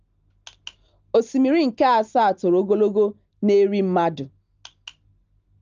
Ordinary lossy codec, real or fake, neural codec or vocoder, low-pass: Opus, 24 kbps; real; none; 7.2 kHz